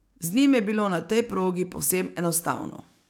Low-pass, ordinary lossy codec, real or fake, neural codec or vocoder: 19.8 kHz; none; fake; codec, 44.1 kHz, 7.8 kbps, DAC